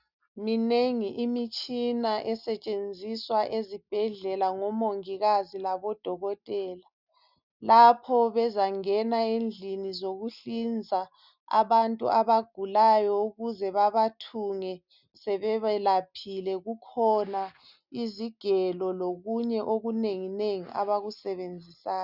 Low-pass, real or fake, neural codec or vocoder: 5.4 kHz; real; none